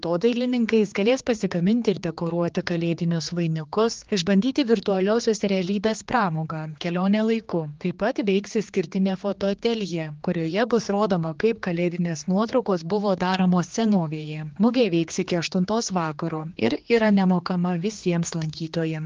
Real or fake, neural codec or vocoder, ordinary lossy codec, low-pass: fake; codec, 16 kHz, 2 kbps, X-Codec, HuBERT features, trained on general audio; Opus, 32 kbps; 7.2 kHz